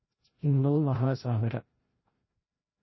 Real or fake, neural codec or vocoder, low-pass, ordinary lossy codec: fake; codec, 16 kHz, 0.5 kbps, FreqCodec, larger model; 7.2 kHz; MP3, 24 kbps